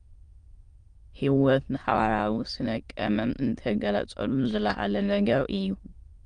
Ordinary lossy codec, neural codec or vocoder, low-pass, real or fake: Opus, 32 kbps; autoencoder, 22.05 kHz, a latent of 192 numbers a frame, VITS, trained on many speakers; 9.9 kHz; fake